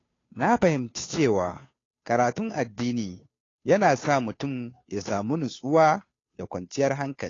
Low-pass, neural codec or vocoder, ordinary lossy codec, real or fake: 7.2 kHz; codec, 16 kHz, 2 kbps, FunCodec, trained on Chinese and English, 25 frames a second; AAC, 32 kbps; fake